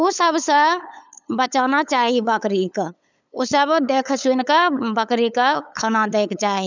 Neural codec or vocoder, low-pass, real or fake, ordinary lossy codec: codec, 16 kHz, 8 kbps, FunCodec, trained on LibriTTS, 25 frames a second; 7.2 kHz; fake; none